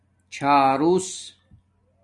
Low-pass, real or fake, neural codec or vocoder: 10.8 kHz; real; none